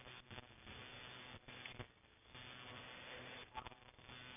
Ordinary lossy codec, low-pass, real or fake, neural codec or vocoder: none; 3.6 kHz; fake; vocoder, 44.1 kHz, 128 mel bands every 256 samples, BigVGAN v2